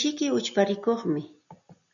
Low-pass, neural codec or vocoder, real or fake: 7.2 kHz; none; real